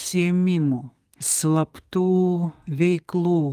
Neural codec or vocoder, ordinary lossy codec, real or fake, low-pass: codec, 32 kHz, 1.9 kbps, SNAC; Opus, 32 kbps; fake; 14.4 kHz